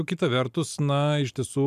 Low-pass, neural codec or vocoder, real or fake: 14.4 kHz; none; real